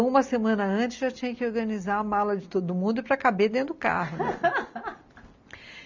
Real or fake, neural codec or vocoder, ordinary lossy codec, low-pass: real; none; MP3, 64 kbps; 7.2 kHz